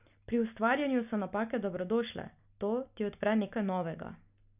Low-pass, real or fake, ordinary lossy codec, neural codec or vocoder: 3.6 kHz; real; none; none